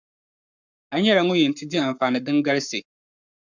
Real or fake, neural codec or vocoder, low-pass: fake; codec, 44.1 kHz, 7.8 kbps, Pupu-Codec; 7.2 kHz